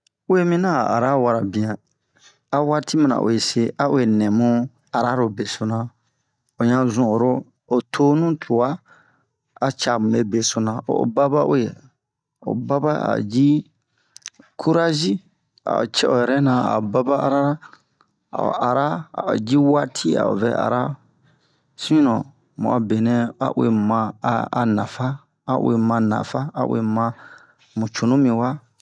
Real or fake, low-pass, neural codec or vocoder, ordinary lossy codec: real; 9.9 kHz; none; none